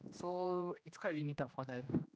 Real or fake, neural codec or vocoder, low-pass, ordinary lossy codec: fake; codec, 16 kHz, 1 kbps, X-Codec, HuBERT features, trained on general audio; none; none